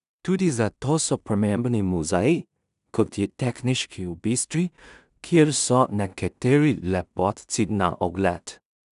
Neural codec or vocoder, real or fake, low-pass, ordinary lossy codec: codec, 16 kHz in and 24 kHz out, 0.4 kbps, LongCat-Audio-Codec, two codebook decoder; fake; 10.8 kHz; none